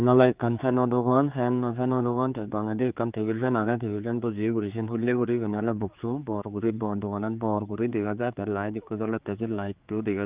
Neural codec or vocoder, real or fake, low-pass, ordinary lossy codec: codec, 16 kHz, 4 kbps, X-Codec, HuBERT features, trained on general audio; fake; 3.6 kHz; Opus, 24 kbps